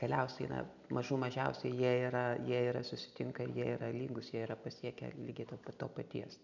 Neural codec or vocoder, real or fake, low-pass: none; real; 7.2 kHz